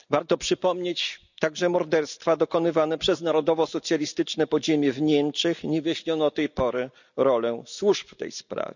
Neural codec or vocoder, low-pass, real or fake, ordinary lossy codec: none; 7.2 kHz; real; none